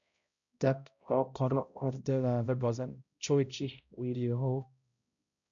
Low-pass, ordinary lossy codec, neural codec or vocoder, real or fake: 7.2 kHz; none; codec, 16 kHz, 0.5 kbps, X-Codec, HuBERT features, trained on balanced general audio; fake